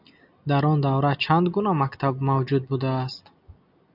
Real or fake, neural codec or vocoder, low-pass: real; none; 5.4 kHz